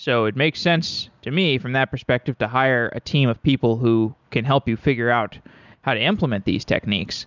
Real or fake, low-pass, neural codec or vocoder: real; 7.2 kHz; none